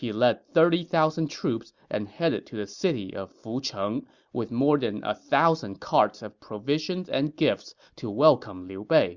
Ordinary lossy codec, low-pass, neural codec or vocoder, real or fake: Opus, 64 kbps; 7.2 kHz; none; real